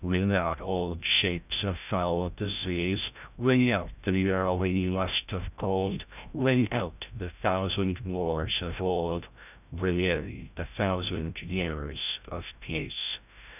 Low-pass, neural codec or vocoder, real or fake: 3.6 kHz; codec, 16 kHz, 0.5 kbps, FreqCodec, larger model; fake